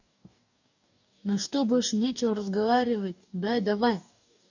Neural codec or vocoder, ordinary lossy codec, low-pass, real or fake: codec, 44.1 kHz, 2.6 kbps, DAC; none; 7.2 kHz; fake